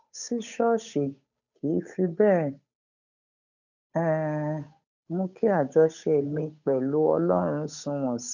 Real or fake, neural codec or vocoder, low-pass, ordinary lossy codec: fake; codec, 16 kHz, 8 kbps, FunCodec, trained on Chinese and English, 25 frames a second; 7.2 kHz; none